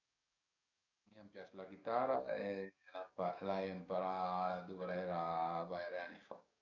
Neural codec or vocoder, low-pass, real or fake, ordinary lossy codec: codec, 44.1 kHz, 7.8 kbps, DAC; 7.2 kHz; fake; none